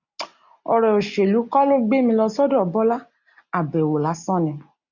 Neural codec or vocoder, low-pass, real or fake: none; 7.2 kHz; real